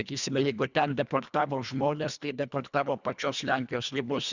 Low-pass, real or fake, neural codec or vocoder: 7.2 kHz; fake; codec, 24 kHz, 1.5 kbps, HILCodec